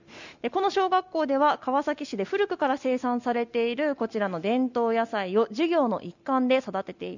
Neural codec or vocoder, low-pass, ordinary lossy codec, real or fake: none; 7.2 kHz; none; real